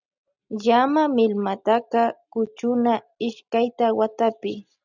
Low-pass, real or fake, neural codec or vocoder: 7.2 kHz; real; none